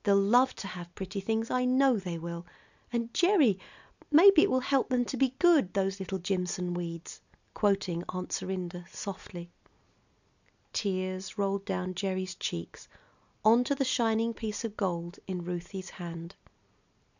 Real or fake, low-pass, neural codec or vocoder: real; 7.2 kHz; none